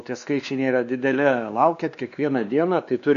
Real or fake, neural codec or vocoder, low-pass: fake; codec, 16 kHz, 2 kbps, X-Codec, WavLM features, trained on Multilingual LibriSpeech; 7.2 kHz